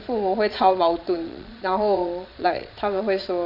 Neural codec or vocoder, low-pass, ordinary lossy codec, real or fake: vocoder, 22.05 kHz, 80 mel bands, Vocos; 5.4 kHz; none; fake